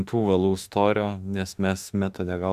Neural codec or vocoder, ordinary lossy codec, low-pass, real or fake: autoencoder, 48 kHz, 32 numbers a frame, DAC-VAE, trained on Japanese speech; Opus, 64 kbps; 14.4 kHz; fake